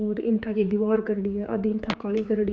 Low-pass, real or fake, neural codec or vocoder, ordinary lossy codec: none; fake; codec, 16 kHz, 4 kbps, X-Codec, HuBERT features, trained on general audio; none